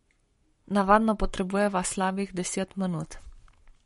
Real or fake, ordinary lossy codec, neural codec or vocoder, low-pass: fake; MP3, 48 kbps; codec, 44.1 kHz, 7.8 kbps, Pupu-Codec; 19.8 kHz